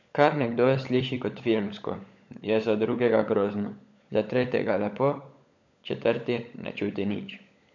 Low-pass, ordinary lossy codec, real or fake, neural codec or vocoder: 7.2 kHz; MP3, 64 kbps; fake; codec, 16 kHz, 16 kbps, FunCodec, trained on LibriTTS, 50 frames a second